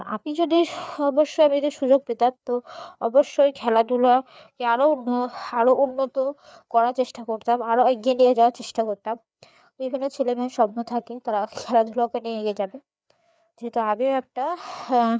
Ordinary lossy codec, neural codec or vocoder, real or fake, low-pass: none; codec, 16 kHz, 4 kbps, FreqCodec, larger model; fake; none